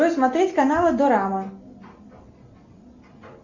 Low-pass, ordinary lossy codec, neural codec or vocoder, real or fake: 7.2 kHz; Opus, 64 kbps; none; real